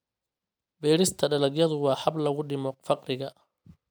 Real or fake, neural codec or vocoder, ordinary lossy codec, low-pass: real; none; none; none